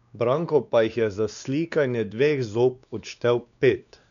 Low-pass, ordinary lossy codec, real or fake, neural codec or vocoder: 7.2 kHz; none; fake; codec, 16 kHz, 2 kbps, X-Codec, WavLM features, trained on Multilingual LibriSpeech